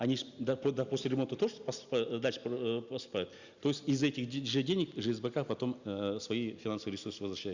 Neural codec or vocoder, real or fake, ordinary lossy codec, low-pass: none; real; Opus, 64 kbps; 7.2 kHz